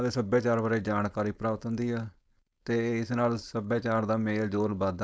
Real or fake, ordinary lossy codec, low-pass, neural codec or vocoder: fake; none; none; codec, 16 kHz, 4.8 kbps, FACodec